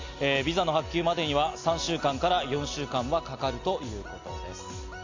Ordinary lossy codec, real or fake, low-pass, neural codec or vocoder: AAC, 48 kbps; real; 7.2 kHz; none